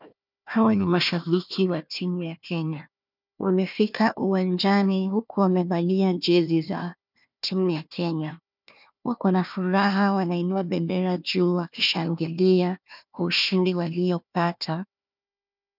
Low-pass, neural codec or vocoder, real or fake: 5.4 kHz; codec, 16 kHz, 1 kbps, FunCodec, trained on Chinese and English, 50 frames a second; fake